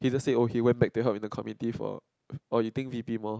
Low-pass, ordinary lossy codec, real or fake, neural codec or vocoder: none; none; real; none